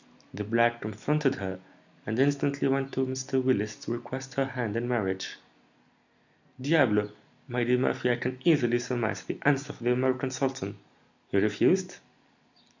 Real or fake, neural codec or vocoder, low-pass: real; none; 7.2 kHz